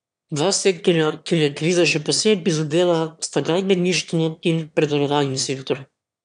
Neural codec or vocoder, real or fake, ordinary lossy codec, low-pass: autoencoder, 22.05 kHz, a latent of 192 numbers a frame, VITS, trained on one speaker; fake; none; 9.9 kHz